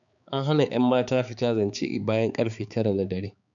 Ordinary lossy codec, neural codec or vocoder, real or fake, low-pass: none; codec, 16 kHz, 4 kbps, X-Codec, HuBERT features, trained on balanced general audio; fake; 7.2 kHz